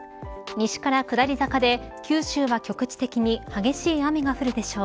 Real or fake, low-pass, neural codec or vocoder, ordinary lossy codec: real; none; none; none